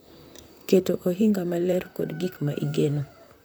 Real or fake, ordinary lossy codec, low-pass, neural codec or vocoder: fake; none; none; vocoder, 44.1 kHz, 128 mel bands, Pupu-Vocoder